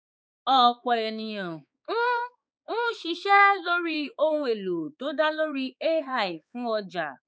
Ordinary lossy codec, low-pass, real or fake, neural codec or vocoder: none; none; fake; codec, 16 kHz, 4 kbps, X-Codec, HuBERT features, trained on balanced general audio